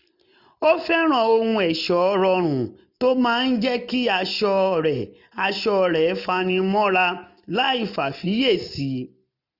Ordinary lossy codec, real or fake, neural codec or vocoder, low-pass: none; real; none; 5.4 kHz